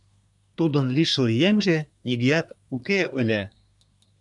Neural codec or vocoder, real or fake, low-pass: codec, 24 kHz, 1 kbps, SNAC; fake; 10.8 kHz